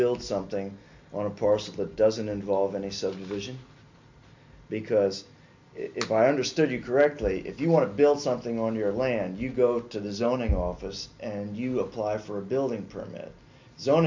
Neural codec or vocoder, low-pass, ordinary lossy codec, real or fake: none; 7.2 kHz; MP3, 64 kbps; real